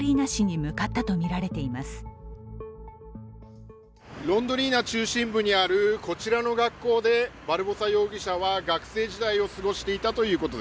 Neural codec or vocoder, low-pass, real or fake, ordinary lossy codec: none; none; real; none